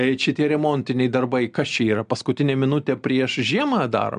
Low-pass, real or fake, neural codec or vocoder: 9.9 kHz; real; none